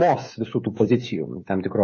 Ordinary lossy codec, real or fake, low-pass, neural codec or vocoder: MP3, 32 kbps; fake; 7.2 kHz; codec, 16 kHz, 16 kbps, FreqCodec, smaller model